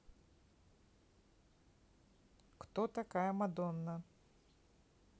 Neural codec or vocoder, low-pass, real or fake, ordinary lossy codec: none; none; real; none